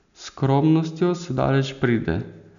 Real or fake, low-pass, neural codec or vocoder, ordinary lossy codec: real; 7.2 kHz; none; none